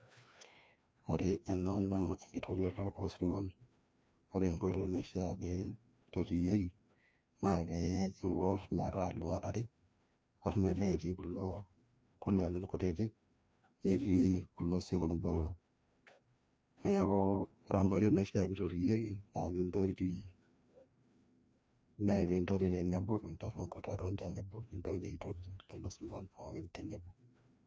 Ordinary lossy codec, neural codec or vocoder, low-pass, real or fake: none; codec, 16 kHz, 1 kbps, FreqCodec, larger model; none; fake